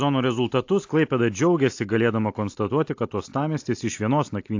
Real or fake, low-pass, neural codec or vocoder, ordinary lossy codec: real; 7.2 kHz; none; AAC, 48 kbps